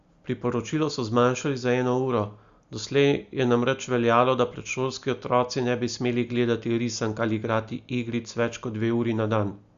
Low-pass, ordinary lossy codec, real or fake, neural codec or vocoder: 7.2 kHz; Opus, 64 kbps; real; none